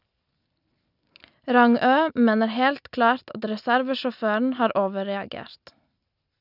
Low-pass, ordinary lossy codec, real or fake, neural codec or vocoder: 5.4 kHz; none; real; none